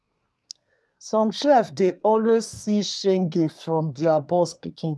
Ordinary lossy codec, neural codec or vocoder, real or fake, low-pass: none; codec, 24 kHz, 1 kbps, SNAC; fake; none